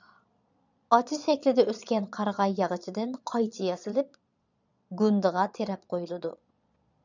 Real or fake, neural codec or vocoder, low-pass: fake; vocoder, 22.05 kHz, 80 mel bands, Vocos; 7.2 kHz